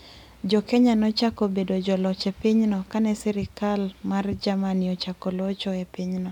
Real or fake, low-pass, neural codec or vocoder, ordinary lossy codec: real; 19.8 kHz; none; none